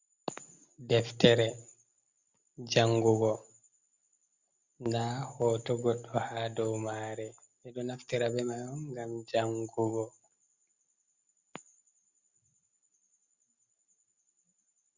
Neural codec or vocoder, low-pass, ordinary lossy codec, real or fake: none; 7.2 kHz; Opus, 64 kbps; real